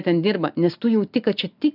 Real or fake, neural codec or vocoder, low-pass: real; none; 5.4 kHz